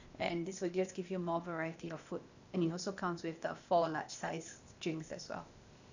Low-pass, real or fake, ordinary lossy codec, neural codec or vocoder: 7.2 kHz; fake; none; codec, 16 kHz, 0.8 kbps, ZipCodec